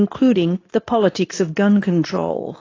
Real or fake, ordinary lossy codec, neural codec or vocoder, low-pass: fake; AAC, 32 kbps; codec, 24 kHz, 0.9 kbps, WavTokenizer, medium speech release version 2; 7.2 kHz